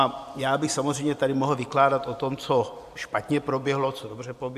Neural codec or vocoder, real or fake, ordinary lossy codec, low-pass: vocoder, 44.1 kHz, 128 mel bands every 512 samples, BigVGAN v2; fake; AAC, 96 kbps; 14.4 kHz